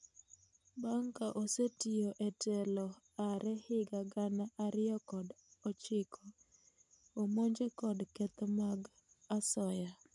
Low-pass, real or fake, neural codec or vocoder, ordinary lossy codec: 10.8 kHz; real; none; none